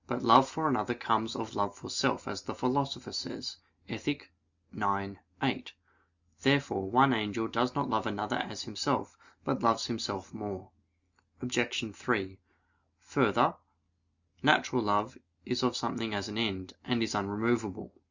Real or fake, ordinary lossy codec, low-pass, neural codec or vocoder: real; Opus, 64 kbps; 7.2 kHz; none